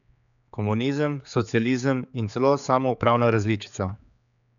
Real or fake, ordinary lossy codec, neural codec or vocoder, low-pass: fake; none; codec, 16 kHz, 4 kbps, X-Codec, HuBERT features, trained on general audio; 7.2 kHz